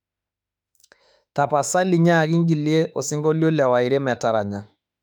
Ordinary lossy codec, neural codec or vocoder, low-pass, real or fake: none; autoencoder, 48 kHz, 32 numbers a frame, DAC-VAE, trained on Japanese speech; 19.8 kHz; fake